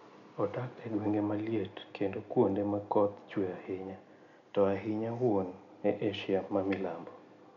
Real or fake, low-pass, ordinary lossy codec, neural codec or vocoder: real; 7.2 kHz; none; none